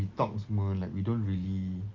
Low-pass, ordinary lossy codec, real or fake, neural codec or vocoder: 7.2 kHz; Opus, 32 kbps; real; none